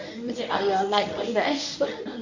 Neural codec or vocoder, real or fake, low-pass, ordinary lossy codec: codec, 24 kHz, 0.9 kbps, WavTokenizer, medium speech release version 1; fake; 7.2 kHz; none